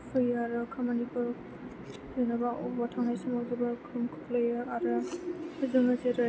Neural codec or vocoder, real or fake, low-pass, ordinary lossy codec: none; real; none; none